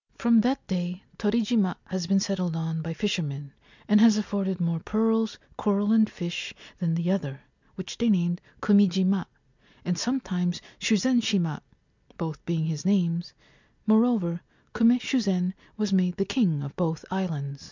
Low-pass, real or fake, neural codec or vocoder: 7.2 kHz; real; none